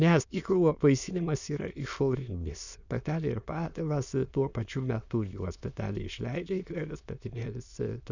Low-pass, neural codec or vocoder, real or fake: 7.2 kHz; autoencoder, 22.05 kHz, a latent of 192 numbers a frame, VITS, trained on many speakers; fake